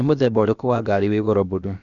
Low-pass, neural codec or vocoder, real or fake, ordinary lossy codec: 7.2 kHz; codec, 16 kHz, about 1 kbps, DyCAST, with the encoder's durations; fake; none